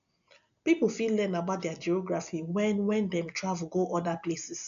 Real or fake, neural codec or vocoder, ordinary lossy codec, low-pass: real; none; none; 7.2 kHz